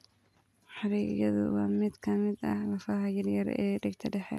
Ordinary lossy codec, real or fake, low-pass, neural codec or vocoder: AAC, 96 kbps; real; 14.4 kHz; none